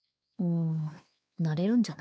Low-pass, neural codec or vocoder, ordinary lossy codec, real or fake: none; codec, 16 kHz, 4 kbps, X-Codec, WavLM features, trained on Multilingual LibriSpeech; none; fake